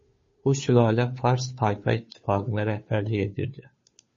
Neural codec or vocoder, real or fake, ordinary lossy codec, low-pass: codec, 16 kHz, 2 kbps, FunCodec, trained on Chinese and English, 25 frames a second; fake; MP3, 32 kbps; 7.2 kHz